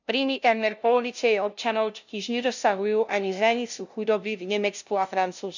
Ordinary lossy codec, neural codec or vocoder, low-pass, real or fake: none; codec, 16 kHz, 0.5 kbps, FunCodec, trained on LibriTTS, 25 frames a second; 7.2 kHz; fake